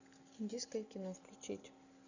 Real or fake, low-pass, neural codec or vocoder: real; 7.2 kHz; none